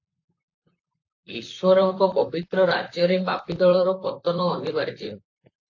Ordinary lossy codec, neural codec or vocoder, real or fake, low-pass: AAC, 48 kbps; vocoder, 44.1 kHz, 128 mel bands, Pupu-Vocoder; fake; 7.2 kHz